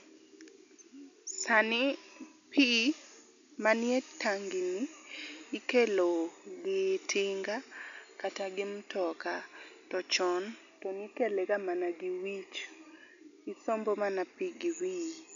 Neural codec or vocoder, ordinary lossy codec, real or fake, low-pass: none; none; real; 7.2 kHz